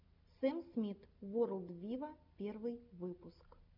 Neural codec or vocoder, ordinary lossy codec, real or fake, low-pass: none; MP3, 48 kbps; real; 5.4 kHz